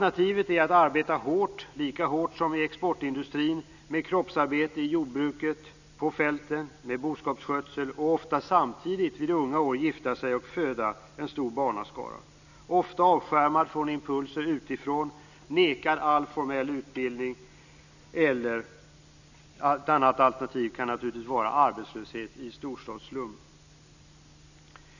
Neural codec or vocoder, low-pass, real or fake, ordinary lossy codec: none; 7.2 kHz; real; none